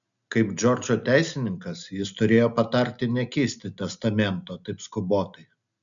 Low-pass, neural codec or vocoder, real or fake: 7.2 kHz; none; real